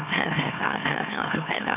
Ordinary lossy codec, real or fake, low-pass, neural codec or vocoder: none; fake; 3.6 kHz; autoencoder, 44.1 kHz, a latent of 192 numbers a frame, MeloTTS